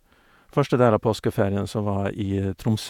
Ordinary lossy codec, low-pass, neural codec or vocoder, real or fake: none; 19.8 kHz; vocoder, 48 kHz, 128 mel bands, Vocos; fake